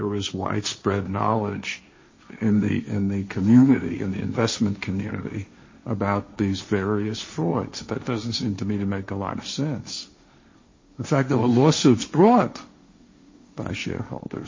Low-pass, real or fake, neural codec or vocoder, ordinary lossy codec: 7.2 kHz; fake; codec, 16 kHz, 1.1 kbps, Voila-Tokenizer; MP3, 32 kbps